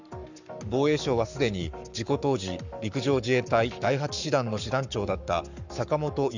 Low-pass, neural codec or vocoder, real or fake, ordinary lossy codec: 7.2 kHz; codec, 44.1 kHz, 7.8 kbps, Pupu-Codec; fake; none